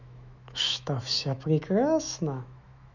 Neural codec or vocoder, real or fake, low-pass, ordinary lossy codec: autoencoder, 48 kHz, 128 numbers a frame, DAC-VAE, trained on Japanese speech; fake; 7.2 kHz; none